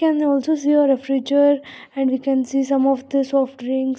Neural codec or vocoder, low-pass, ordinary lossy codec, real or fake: none; none; none; real